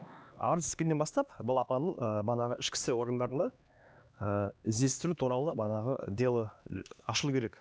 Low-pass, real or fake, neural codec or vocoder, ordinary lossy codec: none; fake; codec, 16 kHz, 2 kbps, X-Codec, HuBERT features, trained on LibriSpeech; none